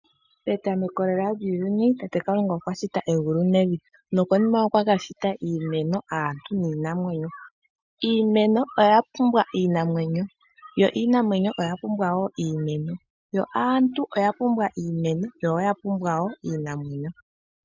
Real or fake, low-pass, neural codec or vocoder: real; 7.2 kHz; none